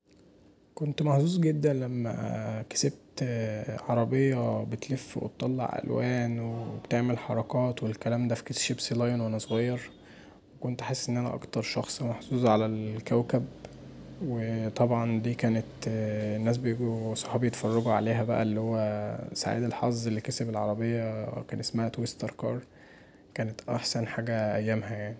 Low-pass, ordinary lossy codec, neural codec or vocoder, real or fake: none; none; none; real